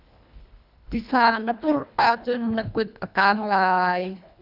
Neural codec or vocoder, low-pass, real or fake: codec, 24 kHz, 1.5 kbps, HILCodec; 5.4 kHz; fake